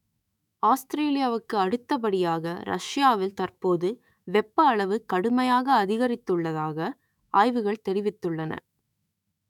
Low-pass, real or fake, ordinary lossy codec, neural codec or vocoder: 19.8 kHz; fake; none; autoencoder, 48 kHz, 128 numbers a frame, DAC-VAE, trained on Japanese speech